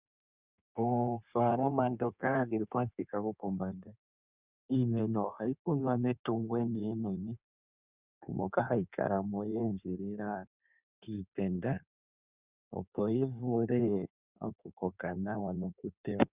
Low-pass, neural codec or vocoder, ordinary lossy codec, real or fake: 3.6 kHz; codec, 16 kHz in and 24 kHz out, 1.1 kbps, FireRedTTS-2 codec; Opus, 64 kbps; fake